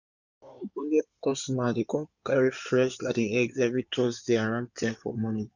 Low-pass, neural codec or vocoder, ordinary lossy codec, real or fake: 7.2 kHz; codec, 16 kHz in and 24 kHz out, 2.2 kbps, FireRedTTS-2 codec; none; fake